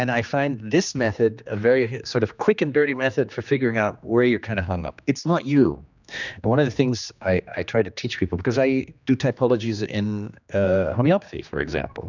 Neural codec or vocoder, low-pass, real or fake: codec, 16 kHz, 2 kbps, X-Codec, HuBERT features, trained on general audio; 7.2 kHz; fake